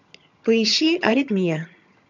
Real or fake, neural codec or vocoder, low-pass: fake; vocoder, 22.05 kHz, 80 mel bands, HiFi-GAN; 7.2 kHz